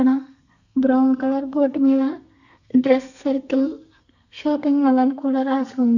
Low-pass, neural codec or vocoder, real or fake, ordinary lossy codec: 7.2 kHz; codec, 32 kHz, 1.9 kbps, SNAC; fake; none